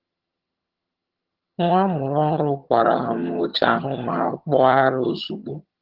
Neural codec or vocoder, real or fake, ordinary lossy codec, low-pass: vocoder, 22.05 kHz, 80 mel bands, HiFi-GAN; fake; Opus, 16 kbps; 5.4 kHz